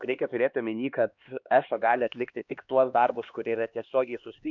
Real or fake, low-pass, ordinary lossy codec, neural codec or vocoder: fake; 7.2 kHz; MP3, 64 kbps; codec, 16 kHz, 2 kbps, X-Codec, HuBERT features, trained on LibriSpeech